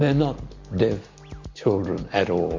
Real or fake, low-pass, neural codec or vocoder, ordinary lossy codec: real; 7.2 kHz; none; MP3, 48 kbps